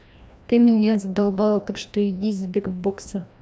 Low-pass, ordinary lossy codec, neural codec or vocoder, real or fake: none; none; codec, 16 kHz, 1 kbps, FreqCodec, larger model; fake